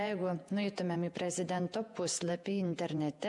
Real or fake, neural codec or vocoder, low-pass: fake; vocoder, 48 kHz, 128 mel bands, Vocos; 10.8 kHz